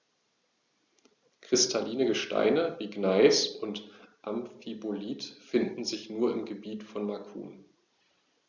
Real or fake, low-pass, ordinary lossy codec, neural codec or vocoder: real; 7.2 kHz; Opus, 64 kbps; none